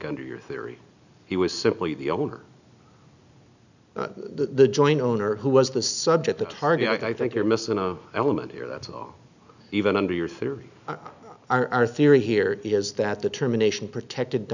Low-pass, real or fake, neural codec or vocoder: 7.2 kHz; real; none